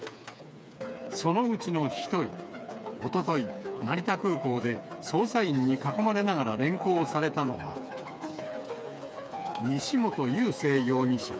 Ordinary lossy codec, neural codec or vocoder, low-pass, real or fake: none; codec, 16 kHz, 4 kbps, FreqCodec, smaller model; none; fake